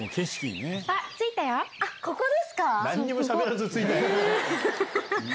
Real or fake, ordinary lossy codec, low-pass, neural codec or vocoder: real; none; none; none